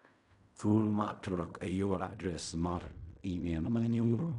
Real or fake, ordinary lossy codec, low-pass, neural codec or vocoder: fake; none; 10.8 kHz; codec, 16 kHz in and 24 kHz out, 0.4 kbps, LongCat-Audio-Codec, fine tuned four codebook decoder